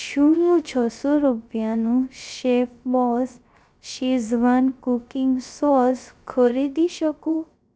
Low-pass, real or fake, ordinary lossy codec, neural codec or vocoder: none; fake; none; codec, 16 kHz, 0.3 kbps, FocalCodec